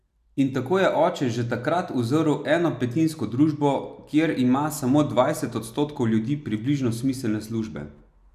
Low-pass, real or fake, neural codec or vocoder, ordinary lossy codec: 14.4 kHz; real; none; none